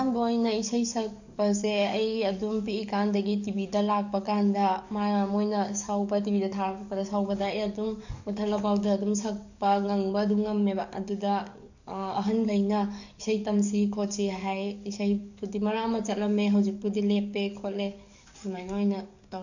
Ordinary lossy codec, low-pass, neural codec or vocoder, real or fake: none; 7.2 kHz; codec, 44.1 kHz, 7.8 kbps, DAC; fake